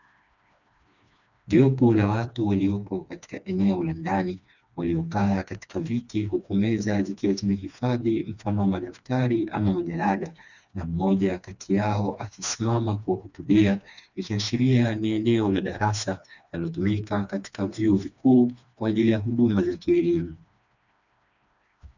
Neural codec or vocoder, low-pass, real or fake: codec, 16 kHz, 2 kbps, FreqCodec, smaller model; 7.2 kHz; fake